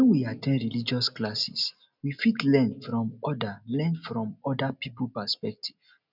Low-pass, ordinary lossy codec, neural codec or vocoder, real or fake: 5.4 kHz; none; none; real